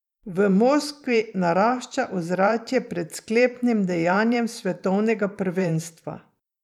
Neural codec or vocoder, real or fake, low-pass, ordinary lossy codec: vocoder, 44.1 kHz, 128 mel bands every 512 samples, BigVGAN v2; fake; 19.8 kHz; none